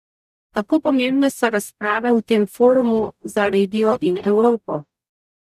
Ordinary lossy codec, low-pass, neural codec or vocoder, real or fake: none; 14.4 kHz; codec, 44.1 kHz, 0.9 kbps, DAC; fake